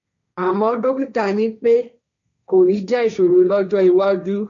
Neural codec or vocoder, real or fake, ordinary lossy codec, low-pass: codec, 16 kHz, 1.1 kbps, Voila-Tokenizer; fake; none; 7.2 kHz